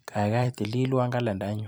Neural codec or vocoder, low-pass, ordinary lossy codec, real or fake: vocoder, 44.1 kHz, 128 mel bands every 256 samples, BigVGAN v2; none; none; fake